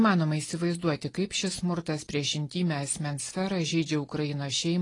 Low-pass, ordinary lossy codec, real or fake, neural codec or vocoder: 10.8 kHz; AAC, 32 kbps; real; none